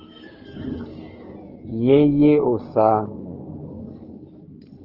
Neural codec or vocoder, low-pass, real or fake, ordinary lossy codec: codec, 16 kHz in and 24 kHz out, 2.2 kbps, FireRedTTS-2 codec; 5.4 kHz; fake; Opus, 16 kbps